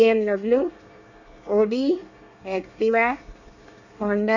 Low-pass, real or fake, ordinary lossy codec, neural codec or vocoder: 7.2 kHz; fake; none; codec, 24 kHz, 1 kbps, SNAC